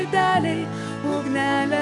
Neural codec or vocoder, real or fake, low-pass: codec, 44.1 kHz, 7.8 kbps, DAC; fake; 10.8 kHz